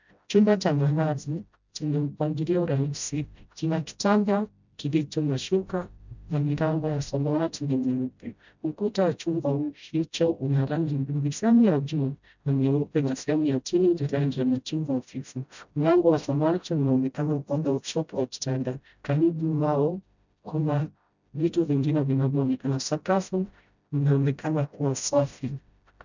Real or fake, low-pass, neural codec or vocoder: fake; 7.2 kHz; codec, 16 kHz, 0.5 kbps, FreqCodec, smaller model